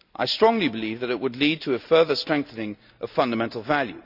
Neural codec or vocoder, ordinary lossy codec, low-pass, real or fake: none; none; 5.4 kHz; real